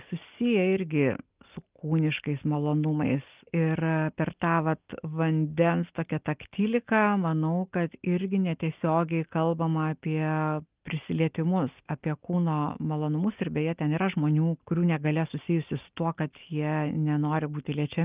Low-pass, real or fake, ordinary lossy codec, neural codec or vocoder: 3.6 kHz; real; Opus, 24 kbps; none